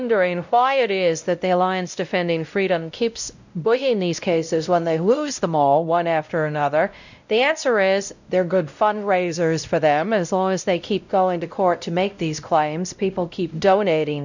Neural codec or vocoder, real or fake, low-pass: codec, 16 kHz, 0.5 kbps, X-Codec, WavLM features, trained on Multilingual LibriSpeech; fake; 7.2 kHz